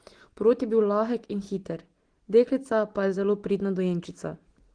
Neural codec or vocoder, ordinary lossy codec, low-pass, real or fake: none; Opus, 16 kbps; 9.9 kHz; real